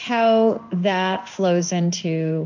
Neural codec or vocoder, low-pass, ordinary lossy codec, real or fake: codec, 16 kHz in and 24 kHz out, 1 kbps, XY-Tokenizer; 7.2 kHz; MP3, 64 kbps; fake